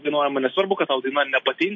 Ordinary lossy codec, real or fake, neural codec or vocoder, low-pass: MP3, 32 kbps; real; none; 7.2 kHz